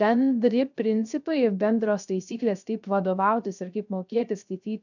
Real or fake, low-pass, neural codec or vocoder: fake; 7.2 kHz; codec, 16 kHz, 0.3 kbps, FocalCodec